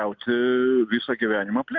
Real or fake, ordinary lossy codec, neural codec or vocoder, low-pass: real; MP3, 64 kbps; none; 7.2 kHz